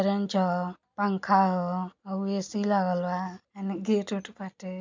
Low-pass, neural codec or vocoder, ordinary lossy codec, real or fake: 7.2 kHz; none; MP3, 64 kbps; real